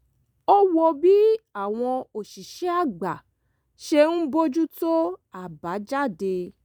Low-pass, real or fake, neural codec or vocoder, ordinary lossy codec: none; real; none; none